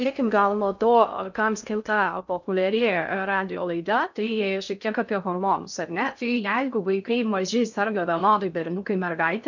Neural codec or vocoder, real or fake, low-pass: codec, 16 kHz in and 24 kHz out, 0.6 kbps, FocalCodec, streaming, 2048 codes; fake; 7.2 kHz